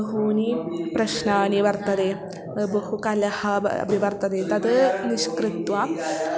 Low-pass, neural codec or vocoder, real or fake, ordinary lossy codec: none; none; real; none